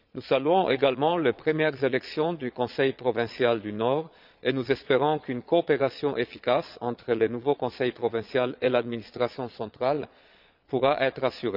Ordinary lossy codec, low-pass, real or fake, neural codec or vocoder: none; 5.4 kHz; fake; vocoder, 22.05 kHz, 80 mel bands, Vocos